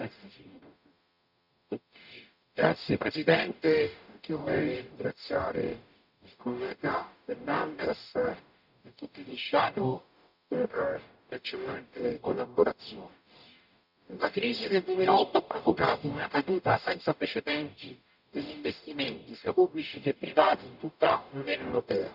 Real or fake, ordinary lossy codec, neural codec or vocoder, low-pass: fake; none; codec, 44.1 kHz, 0.9 kbps, DAC; 5.4 kHz